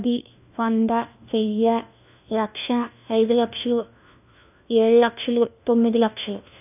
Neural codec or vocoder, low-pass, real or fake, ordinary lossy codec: codec, 16 kHz, 1 kbps, FunCodec, trained on LibriTTS, 50 frames a second; 3.6 kHz; fake; none